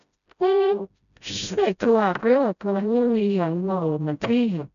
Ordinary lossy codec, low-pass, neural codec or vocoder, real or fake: none; 7.2 kHz; codec, 16 kHz, 0.5 kbps, FreqCodec, smaller model; fake